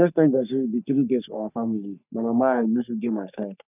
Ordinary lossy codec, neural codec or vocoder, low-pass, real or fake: none; codec, 44.1 kHz, 3.4 kbps, Pupu-Codec; 3.6 kHz; fake